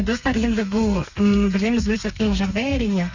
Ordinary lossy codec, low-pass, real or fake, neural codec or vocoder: Opus, 64 kbps; 7.2 kHz; fake; codec, 32 kHz, 1.9 kbps, SNAC